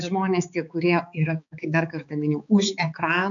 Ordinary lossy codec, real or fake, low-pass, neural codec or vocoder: AAC, 64 kbps; fake; 7.2 kHz; codec, 16 kHz, 4 kbps, X-Codec, HuBERT features, trained on balanced general audio